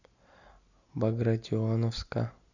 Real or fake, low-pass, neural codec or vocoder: real; 7.2 kHz; none